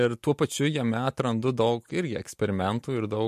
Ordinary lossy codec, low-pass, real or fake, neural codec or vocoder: MP3, 64 kbps; 14.4 kHz; real; none